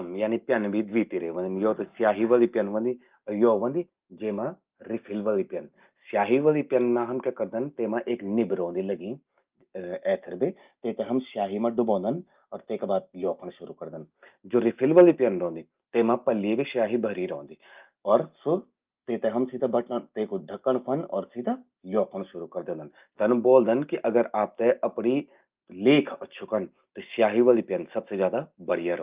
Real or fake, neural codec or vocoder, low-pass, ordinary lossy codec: real; none; 3.6 kHz; Opus, 24 kbps